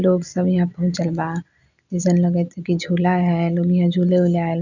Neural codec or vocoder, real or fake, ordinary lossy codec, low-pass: none; real; none; 7.2 kHz